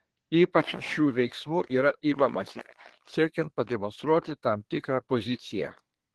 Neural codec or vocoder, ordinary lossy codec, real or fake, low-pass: codec, 24 kHz, 1 kbps, SNAC; Opus, 16 kbps; fake; 10.8 kHz